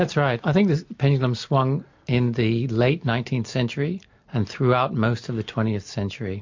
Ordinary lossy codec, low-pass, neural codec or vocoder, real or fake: MP3, 48 kbps; 7.2 kHz; none; real